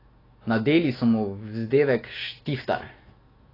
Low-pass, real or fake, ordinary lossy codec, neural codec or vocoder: 5.4 kHz; fake; AAC, 24 kbps; autoencoder, 48 kHz, 128 numbers a frame, DAC-VAE, trained on Japanese speech